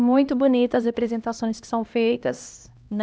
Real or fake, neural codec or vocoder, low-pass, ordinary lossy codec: fake; codec, 16 kHz, 1 kbps, X-Codec, HuBERT features, trained on LibriSpeech; none; none